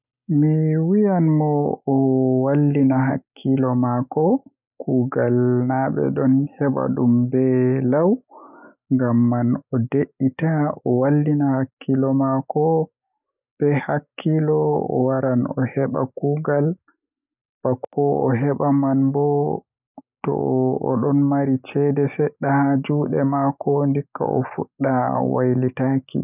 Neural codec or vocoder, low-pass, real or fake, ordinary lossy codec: none; 3.6 kHz; real; none